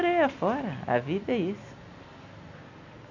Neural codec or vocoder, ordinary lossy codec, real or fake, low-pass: none; none; real; 7.2 kHz